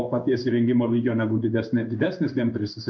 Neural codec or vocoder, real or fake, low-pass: codec, 16 kHz in and 24 kHz out, 1 kbps, XY-Tokenizer; fake; 7.2 kHz